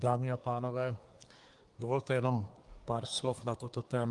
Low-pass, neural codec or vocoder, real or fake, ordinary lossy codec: 10.8 kHz; codec, 24 kHz, 1 kbps, SNAC; fake; Opus, 24 kbps